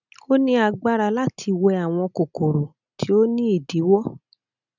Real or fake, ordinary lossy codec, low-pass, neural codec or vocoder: real; none; 7.2 kHz; none